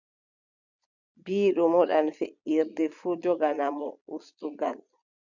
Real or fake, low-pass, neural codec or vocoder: fake; 7.2 kHz; vocoder, 22.05 kHz, 80 mel bands, Vocos